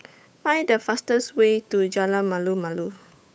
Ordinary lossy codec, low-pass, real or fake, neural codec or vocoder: none; none; fake; codec, 16 kHz, 8 kbps, FunCodec, trained on Chinese and English, 25 frames a second